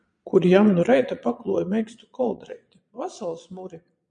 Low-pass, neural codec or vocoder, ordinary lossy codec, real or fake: 9.9 kHz; vocoder, 22.05 kHz, 80 mel bands, Vocos; MP3, 64 kbps; fake